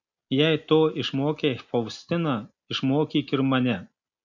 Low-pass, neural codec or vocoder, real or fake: 7.2 kHz; none; real